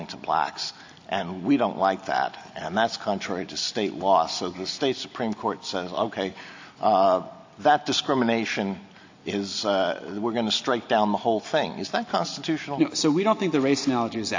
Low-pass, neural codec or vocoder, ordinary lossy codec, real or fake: 7.2 kHz; none; AAC, 48 kbps; real